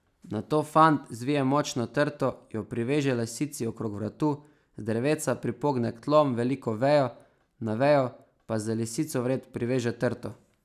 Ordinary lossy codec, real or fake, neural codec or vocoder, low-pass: none; real; none; 14.4 kHz